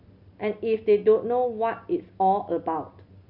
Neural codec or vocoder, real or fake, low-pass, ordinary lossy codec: none; real; 5.4 kHz; none